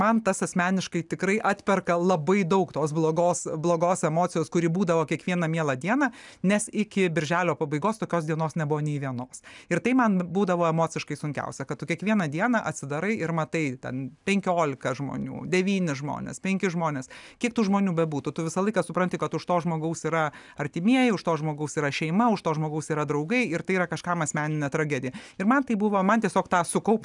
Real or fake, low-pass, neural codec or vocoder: real; 10.8 kHz; none